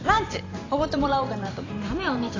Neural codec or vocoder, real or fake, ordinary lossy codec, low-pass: vocoder, 44.1 kHz, 128 mel bands every 512 samples, BigVGAN v2; fake; AAC, 32 kbps; 7.2 kHz